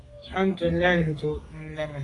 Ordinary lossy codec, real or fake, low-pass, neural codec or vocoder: AAC, 32 kbps; fake; 10.8 kHz; codec, 32 kHz, 1.9 kbps, SNAC